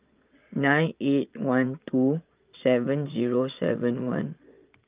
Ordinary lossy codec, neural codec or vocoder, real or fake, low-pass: Opus, 24 kbps; vocoder, 22.05 kHz, 80 mel bands, Vocos; fake; 3.6 kHz